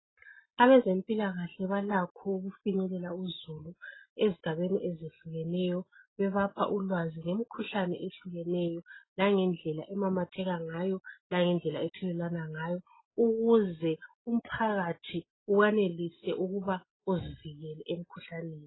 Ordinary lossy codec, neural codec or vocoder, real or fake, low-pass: AAC, 16 kbps; none; real; 7.2 kHz